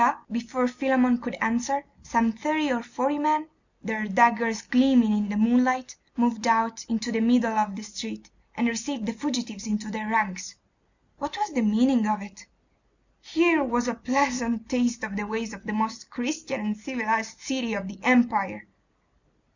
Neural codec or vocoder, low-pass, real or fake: none; 7.2 kHz; real